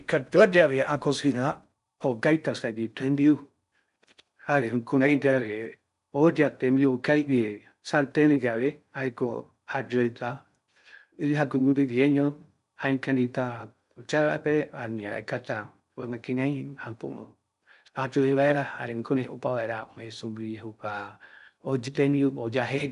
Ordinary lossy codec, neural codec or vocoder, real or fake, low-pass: none; codec, 16 kHz in and 24 kHz out, 0.6 kbps, FocalCodec, streaming, 4096 codes; fake; 10.8 kHz